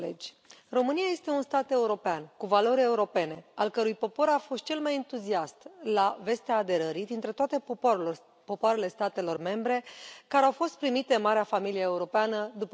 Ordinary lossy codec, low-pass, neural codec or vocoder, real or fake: none; none; none; real